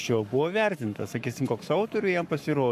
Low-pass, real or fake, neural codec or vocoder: 14.4 kHz; fake; codec, 44.1 kHz, 7.8 kbps, Pupu-Codec